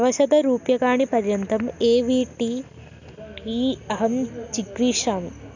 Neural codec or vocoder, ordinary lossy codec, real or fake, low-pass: none; none; real; 7.2 kHz